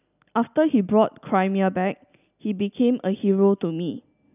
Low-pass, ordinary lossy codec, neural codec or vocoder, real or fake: 3.6 kHz; none; none; real